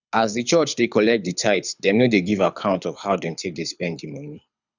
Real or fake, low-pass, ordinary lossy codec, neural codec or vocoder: fake; 7.2 kHz; none; codec, 24 kHz, 6 kbps, HILCodec